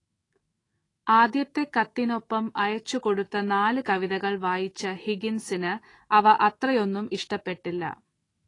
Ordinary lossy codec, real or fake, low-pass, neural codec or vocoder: AAC, 32 kbps; fake; 10.8 kHz; autoencoder, 48 kHz, 128 numbers a frame, DAC-VAE, trained on Japanese speech